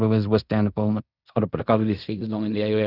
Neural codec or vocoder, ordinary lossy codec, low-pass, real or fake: codec, 16 kHz in and 24 kHz out, 0.4 kbps, LongCat-Audio-Codec, fine tuned four codebook decoder; none; 5.4 kHz; fake